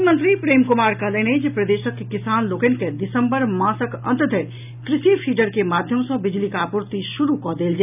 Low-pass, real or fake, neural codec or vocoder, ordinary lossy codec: 3.6 kHz; real; none; none